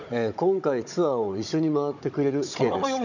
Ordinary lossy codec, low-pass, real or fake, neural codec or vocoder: none; 7.2 kHz; fake; codec, 16 kHz, 16 kbps, FunCodec, trained on Chinese and English, 50 frames a second